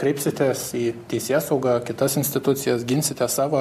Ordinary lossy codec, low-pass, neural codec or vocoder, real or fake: MP3, 64 kbps; 14.4 kHz; none; real